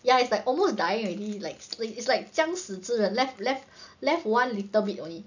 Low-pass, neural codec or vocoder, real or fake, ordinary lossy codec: 7.2 kHz; none; real; none